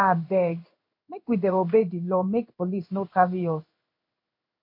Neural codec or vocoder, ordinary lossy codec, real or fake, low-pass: codec, 16 kHz in and 24 kHz out, 1 kbps, XY-Tokenizer; MP3, 32 kbps; fake; 5.4 kHz